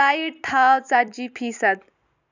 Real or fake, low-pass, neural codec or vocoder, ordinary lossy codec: fake; 7.2 kHz; vocoder, 44.1 kHz, 128 mel bands every 256 samples, BigVGAN v2; none